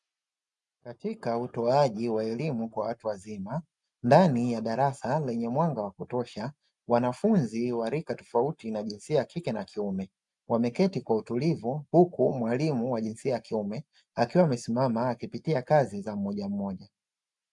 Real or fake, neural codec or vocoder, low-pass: real; none; 10.8 kHz